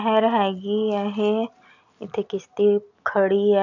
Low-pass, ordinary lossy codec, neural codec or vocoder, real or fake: 7.2 kHz; none; none; real